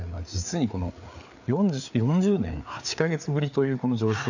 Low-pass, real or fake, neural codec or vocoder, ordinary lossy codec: 7.2 kHz; fake; codec, 16 kHz, 4 kbps, FreqCodec, larger model; none